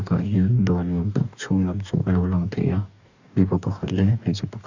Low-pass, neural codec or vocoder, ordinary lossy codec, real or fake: 7.2 kHz; codec, 44.1 kHz, 2.6 kbps, DAC; Opus, 64 kbps; fake